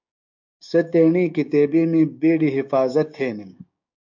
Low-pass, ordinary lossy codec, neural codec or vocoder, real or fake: 7.2 kHz; MP3, 64 kbps; codec, 16 kHz, 6 kbps, DAC; fake